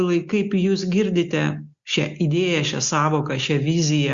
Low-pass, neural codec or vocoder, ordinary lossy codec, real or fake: 7.2 kHz; none; Opus, 64 kbps; real